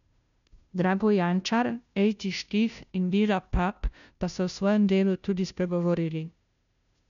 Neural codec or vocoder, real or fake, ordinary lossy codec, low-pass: codec, 16 kHz, 0.5 kbps, FunCodec, trained on Chinese and English, 25 frames a second; fake; none; 7.2 kHz